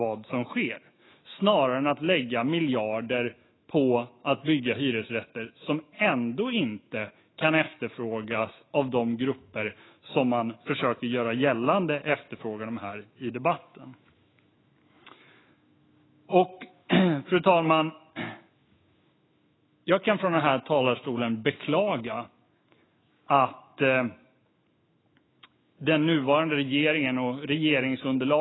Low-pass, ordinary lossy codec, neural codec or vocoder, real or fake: 7.2 kHz; AAC, 16 kbps; autoencoder, 48 kHz, 128 numbers a frame, DAC-VAE, trained on Japanese speech; fake